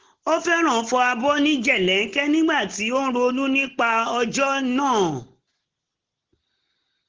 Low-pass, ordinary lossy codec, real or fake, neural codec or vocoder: 7.2 kHz; Opus, 16 kbps; real; none